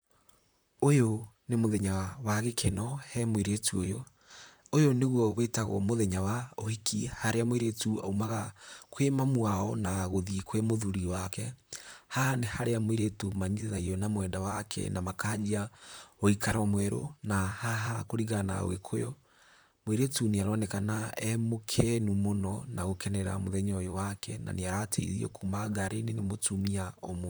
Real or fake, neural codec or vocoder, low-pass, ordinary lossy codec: fake; vocoder, 44.1 kHz, 128 mel bands, Pupu-Vocoder; none; none